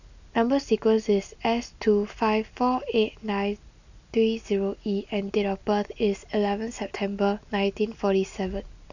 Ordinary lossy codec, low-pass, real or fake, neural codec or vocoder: none; 7.2 kHz; real; none